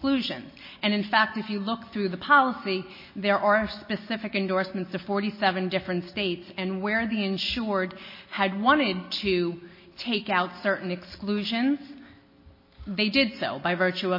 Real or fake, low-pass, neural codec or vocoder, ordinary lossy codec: real; 5.4 kHz; none; MP3, 24 kbps